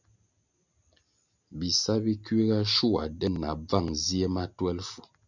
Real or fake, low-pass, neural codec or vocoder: real; 7.2 kHz; none